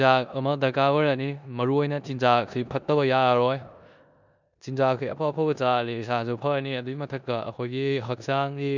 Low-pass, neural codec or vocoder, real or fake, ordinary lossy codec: 7.2 kHz; codec, 16 kHz in and 24 kHz out, 0.9 kbps, LongCat-Audio-Codec, four codebook decoder; fake; none